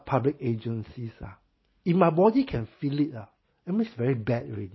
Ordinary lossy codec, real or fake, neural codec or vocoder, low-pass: MP3, 24 kbps; real; none; 7.2 kHz